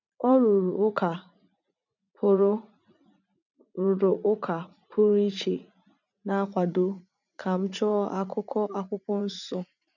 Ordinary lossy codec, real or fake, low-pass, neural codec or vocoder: none; fake; 7.2 kHz; vocoder, 44.1 kHz, 80 mel bands, Vocos